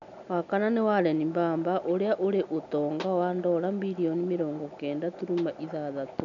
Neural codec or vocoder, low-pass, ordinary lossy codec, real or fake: none; 7.2 kHz; none; real